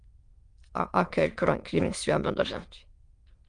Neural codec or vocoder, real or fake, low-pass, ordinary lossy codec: autoencoder, 22.05 kHz, a latent of 192 numbers a frame, VITS, trained on many speakers; fake; 9.9 kHz; Opus, 32 kbps